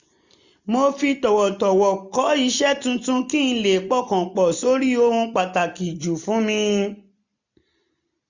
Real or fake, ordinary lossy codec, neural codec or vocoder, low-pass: real; none; none; 7.2 kHz